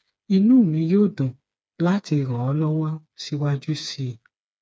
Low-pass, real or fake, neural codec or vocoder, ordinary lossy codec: none; fake; codec, 16 kHz, 4 kbps, FreqCodec, smaller model; none